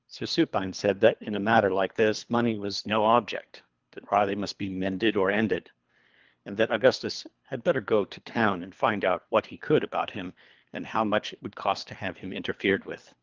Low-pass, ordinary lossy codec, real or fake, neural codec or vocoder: 7.2 kHz; Opus, 32 kbps; fake; codec, 24 kHz, 3 kbps, HILCodec